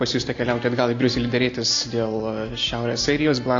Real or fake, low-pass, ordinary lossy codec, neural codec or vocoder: real; 7.2 kHz; AAC, 32 kbps; none